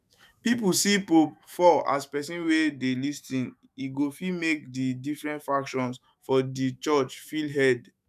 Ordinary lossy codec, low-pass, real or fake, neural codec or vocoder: none; 14.4 kHz; fake; autoencoder, 48 kHz, 128 numbers a frame, DAC-VAE, trained on Japanese speech